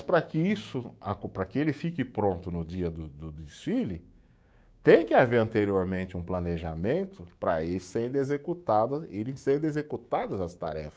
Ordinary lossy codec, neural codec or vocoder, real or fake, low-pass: none; codec, 16 kHz, 6 kbps, DAC; fake; none